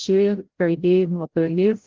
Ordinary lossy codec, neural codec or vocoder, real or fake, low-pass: Opus, 16 kbps; codec, 16 kHz, 0.5 kbps, FreqCodec, larger model; fake; 7.2 kHz